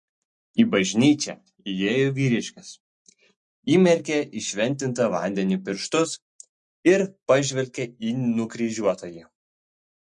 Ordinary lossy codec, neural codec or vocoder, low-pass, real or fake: MP3, 48 kbps; none; 10.8 kHz; real